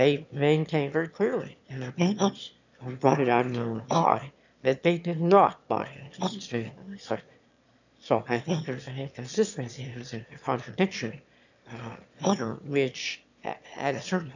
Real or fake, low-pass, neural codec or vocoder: fake; 7.2 kHz; autoencoder, 22.05 kHz, a latent of 192 numbers a frame, VITS, trained on one speaker